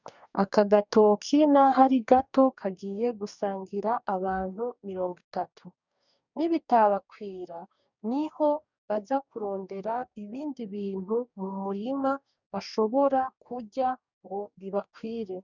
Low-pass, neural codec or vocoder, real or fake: 7.2 kHz; codec, 44.1 kHz, 2.6 kbps, DAC; fake